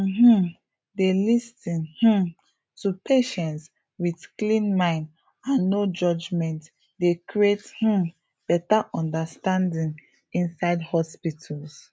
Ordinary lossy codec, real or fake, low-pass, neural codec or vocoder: none; real; none; none